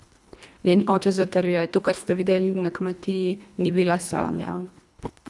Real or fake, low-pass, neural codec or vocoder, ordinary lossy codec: fake; none; codec, 24 kHz, 1.5 kbps, HILCodec; none